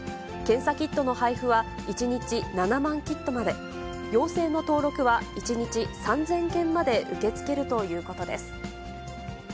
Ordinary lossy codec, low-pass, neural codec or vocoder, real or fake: none; none; none; real